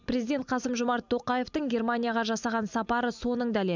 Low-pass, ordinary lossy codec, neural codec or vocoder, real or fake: 7.2 kHz; none; none; real